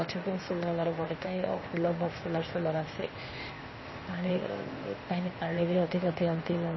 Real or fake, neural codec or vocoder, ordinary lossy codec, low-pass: fake; codec, 16 kHz, 0.8 kbps, ZipCodec; MP3, 24 kbps; 7.2 kHz